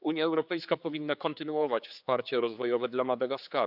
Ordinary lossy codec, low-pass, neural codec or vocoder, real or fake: none; 5.4 kHz; codec, 16 kHz, 4 kbps, X-Codec, HuBERT features, trained on general audio; fake